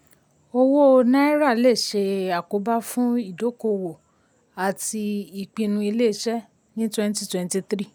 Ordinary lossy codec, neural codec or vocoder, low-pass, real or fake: none; none; none; real